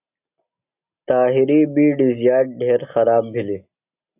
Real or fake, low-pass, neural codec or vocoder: real; 3.6 kHz; none